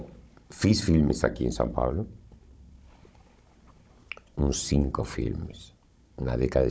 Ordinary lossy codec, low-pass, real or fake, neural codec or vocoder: none; none; fake; codec, 16 kHz, 16 kbps, FunCodec, trained on Chinese and English, 50 frames a second